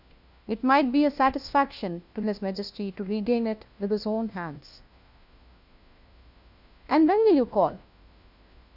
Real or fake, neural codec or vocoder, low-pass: fake; codec, 16 kHz, 1 kbps, FunCodec, trained on LibriTTS, 50 frames a second; 5.4 kHz